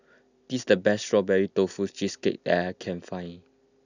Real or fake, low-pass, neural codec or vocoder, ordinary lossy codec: real; 7.2 kHz; none; none